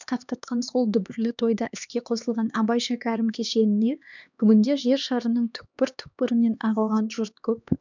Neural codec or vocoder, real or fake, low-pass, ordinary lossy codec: codec, 16 kHz, 2 kbps, X-Codec, HuBERT features, trained on LibriSpeech; fake; 7.2 kHz; none